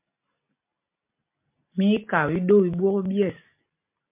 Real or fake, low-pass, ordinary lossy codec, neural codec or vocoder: real; 3.6 kHz; MP3, 24 kbps; none